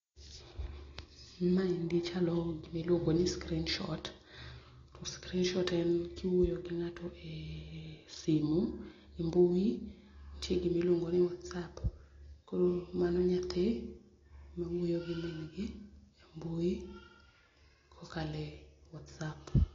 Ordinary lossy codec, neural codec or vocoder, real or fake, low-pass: AAC, 32 kbps; none; real; 7.2 kHz